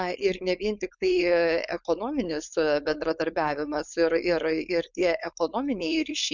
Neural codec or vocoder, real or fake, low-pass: codec, 16 kHz, 4.8 kbps, FACodec; fake; 7.2 kHz